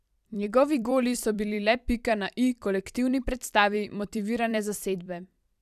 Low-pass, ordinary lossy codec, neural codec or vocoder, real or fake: 14.4 kHz; none; vocoder, 44.1 kHz, 128 mel bands every 256 samples, BigVGAN v2; fake